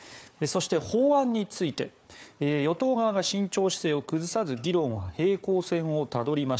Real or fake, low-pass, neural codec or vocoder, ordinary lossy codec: fake; none; codec, 16 kHz, 4 kbps, FunCodec, trained on Chinese and English, 50 frames a second; none